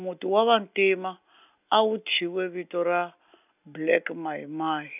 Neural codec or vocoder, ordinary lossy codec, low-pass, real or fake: none; none; 3.6 kHz; real